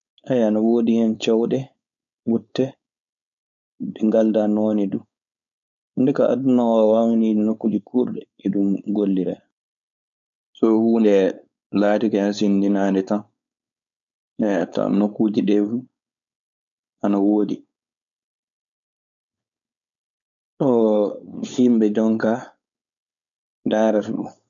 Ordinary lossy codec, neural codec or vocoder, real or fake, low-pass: none; codec, 16 kHz, 4.8 kbps, FACodec; fake; 7.2 kHz